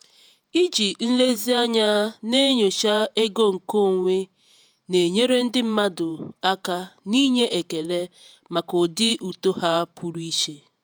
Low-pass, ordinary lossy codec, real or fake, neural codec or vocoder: none; none; fake; vocoder, 48 kHz, 128 mel bands, Vocos